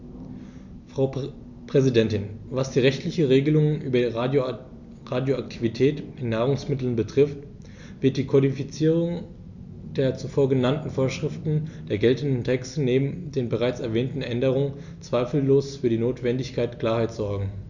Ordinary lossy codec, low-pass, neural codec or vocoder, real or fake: none; 7.2 kHz; none; real